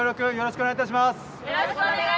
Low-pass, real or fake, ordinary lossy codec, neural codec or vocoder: none; real; none; none